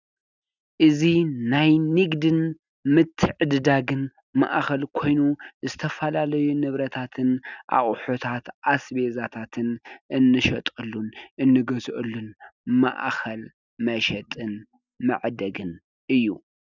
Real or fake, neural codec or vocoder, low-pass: real; none; 7.2 kHz